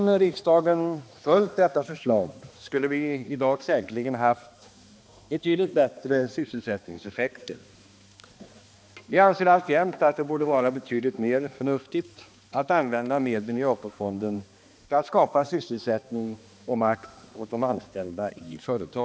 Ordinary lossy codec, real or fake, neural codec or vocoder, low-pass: none; fake; codec, 16 kHz, 2 kbps, X-Codec, HuBERT features, trained on balanced general audio; none